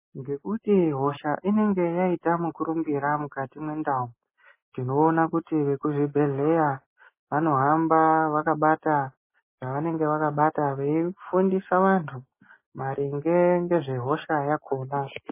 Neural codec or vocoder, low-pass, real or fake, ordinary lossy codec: none; 3.6 kHz; real; MP3, 16 kbps